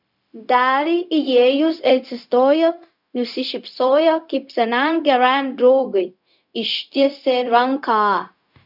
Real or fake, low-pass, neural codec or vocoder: fake; 5.4 kHz; codec, 16 kHz, 0.4 kbps, LongCat-Audio-Codec